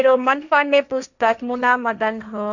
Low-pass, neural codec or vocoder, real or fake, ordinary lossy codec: 7.2 kHz; codec, 16 kHz, 1.1 kbps, Voila-Tokenizer; fake; none